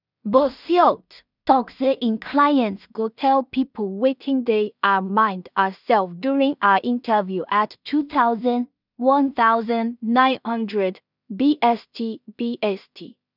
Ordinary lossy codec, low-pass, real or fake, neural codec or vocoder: none; 5.4 kHz; fake; codec, 16 kHz in and 24 kHz out, 0.4 kbps, LongCat-Audio-Codec, two codebook decoder